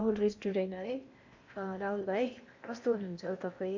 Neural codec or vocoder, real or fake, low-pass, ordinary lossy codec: codec, 16 kHz in and 24 kHz out, 0.8 kbps, FocalCodec, streaming, 65536 codes; fake; 7.2 kHz; MP3, 64 kbps